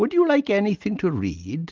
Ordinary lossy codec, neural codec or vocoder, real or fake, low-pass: Opus, 32 kbps; none; real; 7.2 kHz